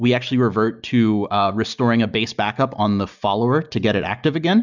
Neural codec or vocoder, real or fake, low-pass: none; real; 7.2 kHz